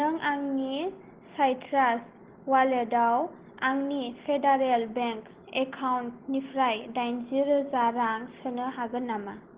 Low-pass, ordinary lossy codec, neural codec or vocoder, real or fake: 3.6 kHz; Opus, 16 kbps; none; real